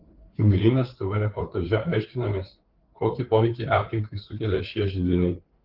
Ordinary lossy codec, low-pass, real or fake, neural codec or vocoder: Opus, 16 kbps; 5.4 kHz; fake; codec, 16 kHz, 4 kbps, FreqCodec, larger model